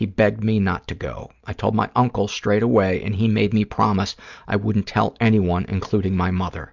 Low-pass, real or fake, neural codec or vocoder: 7.2 kHz; real; none